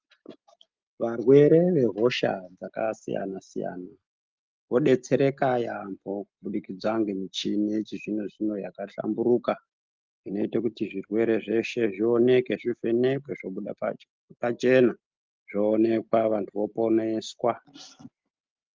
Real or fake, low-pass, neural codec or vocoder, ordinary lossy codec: real; 7.2 kHz; none; Opus, 32 kbps